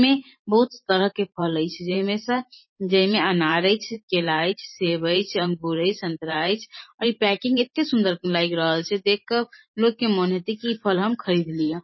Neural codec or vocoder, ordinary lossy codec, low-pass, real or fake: vocoder, 44.1 kHz, 128 mel bands every 512 samples, BigVGAN v2; MP3, 24 kbps; 7.2 kHz; fake